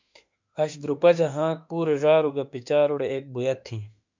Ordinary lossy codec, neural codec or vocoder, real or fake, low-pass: MP3, 64 kbps; autoencoder, 48 kHz, 32 numbers a frame, DAC-VAE, trained on Japanese speech; fake; 7.2 kHz